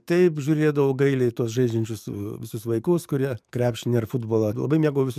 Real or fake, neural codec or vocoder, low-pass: fake; codec, 44.1 kHz, 7.8 kbps, DAC; 14.4 kHz